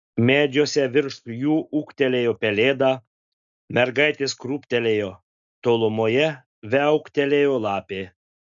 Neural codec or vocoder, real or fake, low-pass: none; real; 7.2 kHz